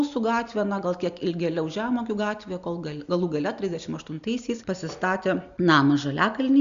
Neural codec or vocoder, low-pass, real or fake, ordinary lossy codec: none; 7.2 kHz; real; Opus, 64 kbps